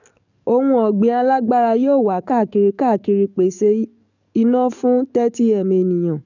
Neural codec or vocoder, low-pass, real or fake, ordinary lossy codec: codec, 44.1 kHz, 7.8 kbps, DAC; 7.2 kHz; fake; none